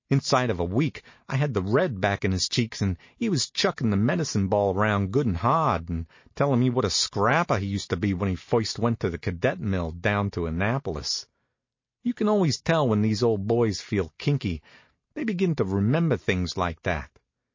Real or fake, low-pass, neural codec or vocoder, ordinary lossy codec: real; 7.2 kHz; none; MP3, 32 kbps